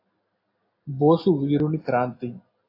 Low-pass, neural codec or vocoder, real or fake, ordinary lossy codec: 5.4 kHz; none; real; AAC, 32 kbps